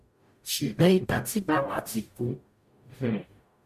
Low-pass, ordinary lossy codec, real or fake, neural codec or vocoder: 14.4 kHz; MP3, 64 kbps; fake; codec, 44.1 kHz, 0.9 kbps, DAC